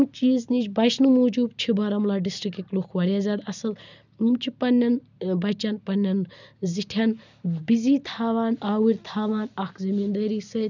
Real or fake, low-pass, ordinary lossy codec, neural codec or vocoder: real; 7.2 kHz; none; none